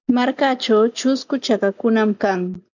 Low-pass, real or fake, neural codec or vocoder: 7.2 kHz; real; none